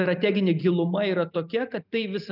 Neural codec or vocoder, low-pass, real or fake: none; 5.4 kHz; real